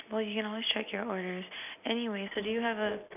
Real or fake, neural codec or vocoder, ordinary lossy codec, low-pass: real; none; none; 3.6 kHz